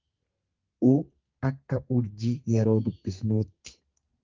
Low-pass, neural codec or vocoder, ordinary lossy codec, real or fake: 7.2 kHz; codec, 32 kHz, 1.9 kbps, SNAC; Opus, 32 kbps; fake